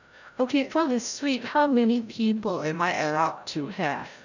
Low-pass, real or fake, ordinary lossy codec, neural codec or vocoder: 7.2 kHz; fake; none; codec, 16 kHz, 0.5 kbps, FreqCodec, larger model